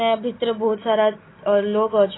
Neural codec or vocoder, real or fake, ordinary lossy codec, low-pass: none; real; AAC, 16 kbps; 7.2 kHz